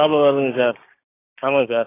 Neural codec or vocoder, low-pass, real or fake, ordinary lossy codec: codec, 16 kHz, 6 kbps, DAC; 3.6 kHz; fake; AAC, 16 kbps